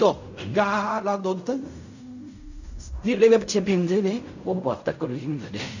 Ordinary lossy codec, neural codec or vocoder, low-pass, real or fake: none; codec, 16 kHz in and 24 kHz out, 0.4 kbps, LongCat-Audio-Codec, fine tuned four codebook decoder; 7.2 kHz; fake